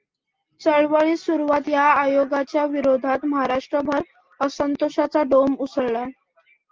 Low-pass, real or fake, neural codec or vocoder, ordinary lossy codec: 7.2 kHz; real; none; Opus, 32 kbps